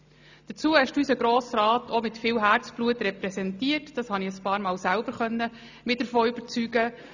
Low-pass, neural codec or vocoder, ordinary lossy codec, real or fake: 7.2 kHz; none; none; real